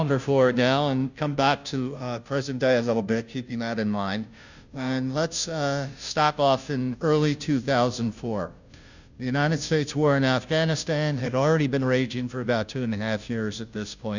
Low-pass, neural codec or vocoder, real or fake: 7.2 kHz; codec, 16 kHz, 0.5 kbps, FunCodec, trained on Chinese and English, 25 frames a second; fake